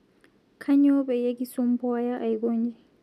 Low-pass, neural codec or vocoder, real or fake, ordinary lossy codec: 14.4 kHz; none; real; none